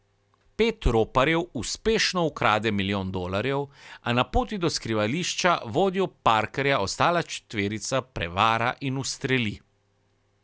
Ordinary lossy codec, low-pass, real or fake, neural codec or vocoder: none; none; real; none